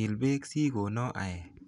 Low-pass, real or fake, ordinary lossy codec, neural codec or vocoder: 10.8 kHz; real; none; none